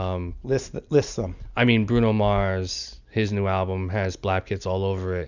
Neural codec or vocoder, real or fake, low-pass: none; real; 7.2 kHz